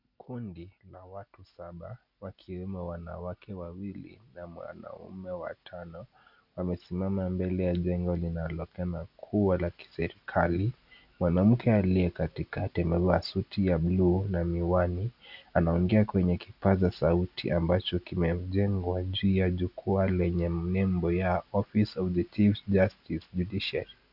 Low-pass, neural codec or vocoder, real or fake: 5.4 kHz; none; real